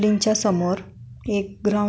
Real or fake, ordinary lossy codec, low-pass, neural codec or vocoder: real; none; none; none